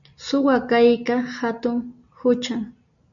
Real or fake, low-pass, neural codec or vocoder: real; 7.2 kHz; none